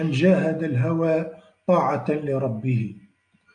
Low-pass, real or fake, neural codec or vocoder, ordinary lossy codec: 9.9 kHz; real; none; AAC, 64 kbps